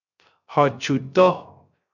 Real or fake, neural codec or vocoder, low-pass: fake; codec, 16 kHz, 0.3 kbps, FocalCodec; 7.2 kHz